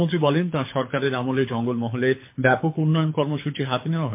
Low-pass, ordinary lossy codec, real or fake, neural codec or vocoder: 3.6 kHz; MP3, 24 kbps; fake; codec, 24 kHz, 6 kbps, HILCodec